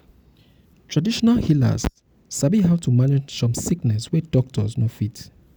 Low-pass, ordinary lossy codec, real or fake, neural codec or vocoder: none; none; real; none